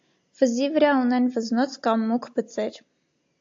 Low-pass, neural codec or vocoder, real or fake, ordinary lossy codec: 7.2 kHz; none; real; AAC, 48 kbps